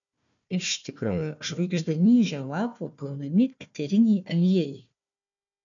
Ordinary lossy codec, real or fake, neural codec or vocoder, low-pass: MP3, 96 kbps; fake; codec, 16 kHz, 1 kbps, FunCodec, trained on Chinese and English, 50 frames a second; 7.2 kHz